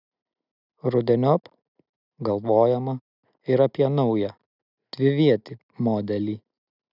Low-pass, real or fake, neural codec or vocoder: 5.4 kHz; real; none